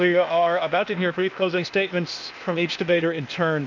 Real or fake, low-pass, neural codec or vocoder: fake; 7.2 kHz; codec, 16 kHz, 0.8 kbps, ZipCodec